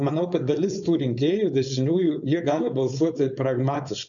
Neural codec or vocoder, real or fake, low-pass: codec, 16 kHz, 4.8 kbps, FACodec; fake; 7.2 kHz